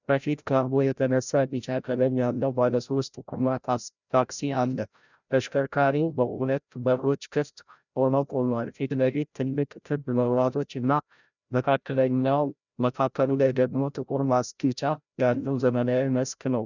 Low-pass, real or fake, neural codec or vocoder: 7.2 kHz; fake; codec, 16 kHz, 0.5 kbps, FreqCodec, larger model